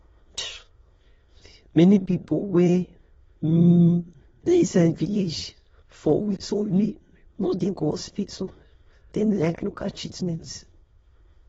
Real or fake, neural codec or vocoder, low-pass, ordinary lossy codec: fake; autoencoder, 22.05 kHz, a latent of 192 numbers a frame, VITS, trained on many speakers; 9.9 kHz; AAC, 24 kbps